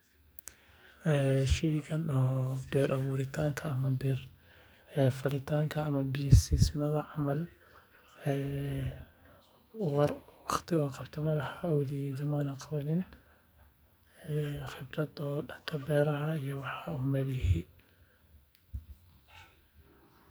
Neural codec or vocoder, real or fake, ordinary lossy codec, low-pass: codec, 44.1 kHz, 2.6 kbps, SNAC; fake; none; none